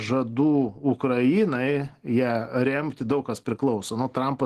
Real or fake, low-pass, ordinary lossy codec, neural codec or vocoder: real; 14.4 kHz; Opus, 16 kbps; none